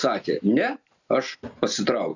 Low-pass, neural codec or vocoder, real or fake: 7.2 kHz; none; real